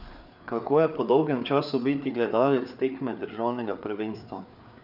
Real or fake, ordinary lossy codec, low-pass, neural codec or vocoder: fake; none; 5.4 kHz; codec, 16 kHz, 4 kbps, FreqCodec, larger model